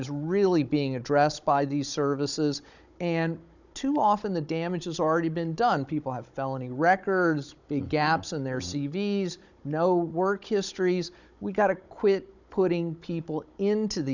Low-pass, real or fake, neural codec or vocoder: 7.2 kHz; fake; codec, 16 kHz, 16 kbps, FunCodec, trained on Chinese and English, 50 frames a second